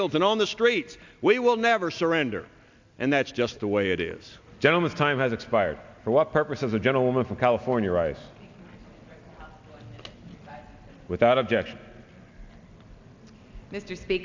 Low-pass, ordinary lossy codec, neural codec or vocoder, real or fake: 7.2 kHz; MP3, 64 kbps; none; real